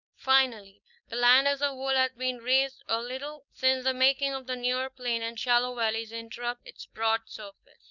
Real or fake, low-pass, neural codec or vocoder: fake; 7.2 kHz; codec, 16 kHz, 4.8 kbps, FACodec